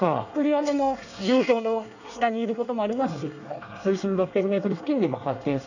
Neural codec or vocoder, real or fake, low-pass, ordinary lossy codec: codec, 24 kHz, 1 kbps, SNAC; fake; 7.2 kHz; none